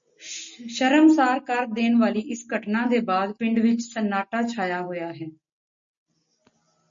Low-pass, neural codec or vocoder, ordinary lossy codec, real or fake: 7.2 kHz; none; MP3, 48 kbps; real